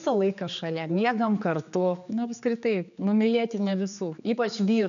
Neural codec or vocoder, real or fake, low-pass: codec, 16 kHz, 4 kbps, X-Codec, HuBERT features, trained on general audio; fake; 7.2 kHz